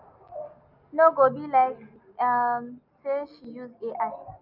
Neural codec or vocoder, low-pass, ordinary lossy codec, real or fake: none; 5.4 kHz; none; real